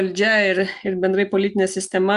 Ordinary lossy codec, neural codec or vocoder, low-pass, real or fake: Opus, 64 kbps; none; 10.8 kHz; real